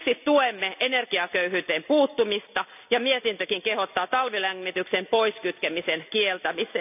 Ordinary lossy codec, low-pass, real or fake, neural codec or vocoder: none; 3.6 kHz; real; none